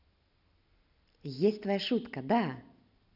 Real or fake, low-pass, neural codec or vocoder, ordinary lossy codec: real; 5.4 kHz; none; none